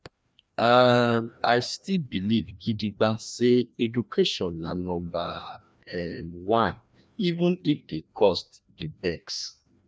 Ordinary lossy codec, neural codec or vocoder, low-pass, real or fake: none; codec, 16 kHz, 1 kbps, FreqCodec, larger model; none; fake